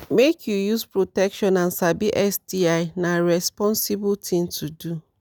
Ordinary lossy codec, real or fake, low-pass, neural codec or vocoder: none; real; none; none